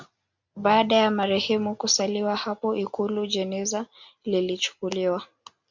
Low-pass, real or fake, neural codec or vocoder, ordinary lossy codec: 7.2 kHz; real; none; MP3, 64 kbps